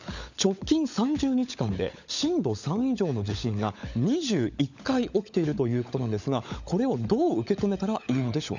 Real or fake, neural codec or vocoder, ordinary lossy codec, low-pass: fake; codec, 16 kHz, 16 kbps, FunCodec, trained on LibriTTS, 50 frames a second; none; 7.2 kHz